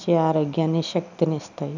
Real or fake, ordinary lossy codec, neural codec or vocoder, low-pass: real; none; none; 7.2 kHz